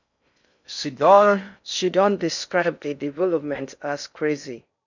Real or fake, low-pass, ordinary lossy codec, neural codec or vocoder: fake; 7.2 kHz; none; codec, 16 kHz in and 24 kHz out, 0.6 kbps, FocalCodec, streaming, 4096 codes